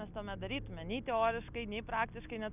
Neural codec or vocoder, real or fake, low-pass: none; real; 3.6 kHz